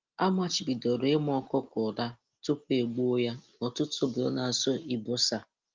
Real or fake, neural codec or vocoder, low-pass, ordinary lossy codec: real; none; 7.2 kHz; Opus, 32 kbps